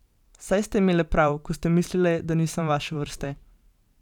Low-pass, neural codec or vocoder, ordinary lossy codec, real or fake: 19.8 kHz; vocoder, 44.1 kHz, 128 mel bands every 512 samples, BigVGAN v2; none; fake